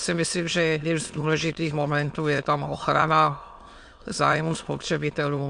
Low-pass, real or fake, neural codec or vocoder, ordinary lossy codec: 9.9 kHz; fake; autoencoder, 22.05 kHz, a latent of 192 numbers a frame, VITS, trained on many speakers; MP3, 64 kbps